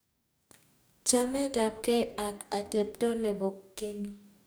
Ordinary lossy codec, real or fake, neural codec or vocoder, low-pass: none; fake; codec, 44.1 kHz, 2.6 kbps, DAC; none